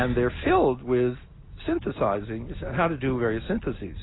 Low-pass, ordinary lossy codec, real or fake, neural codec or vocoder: 7.2 kHz; AAC, 16 kbps; real; none